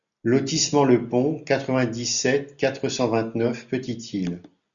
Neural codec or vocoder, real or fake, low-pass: none; real; 7.2 kHz